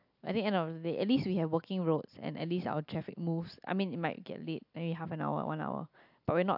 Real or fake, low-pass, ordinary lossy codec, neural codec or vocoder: real; 5.4 kHz; AAC, 48 kbps; none